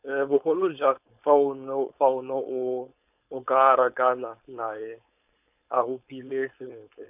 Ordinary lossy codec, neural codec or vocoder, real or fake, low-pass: none; codec, 16 kHz, 4.8 kbps, FACodec; fake; 3.6 kHz